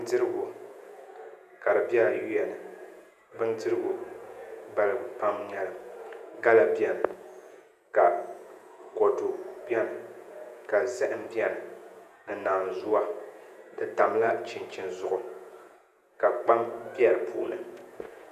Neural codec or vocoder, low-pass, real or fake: vocoder, 48 kHz, 128 mel bands, Vocos; 14.4 kHz; fake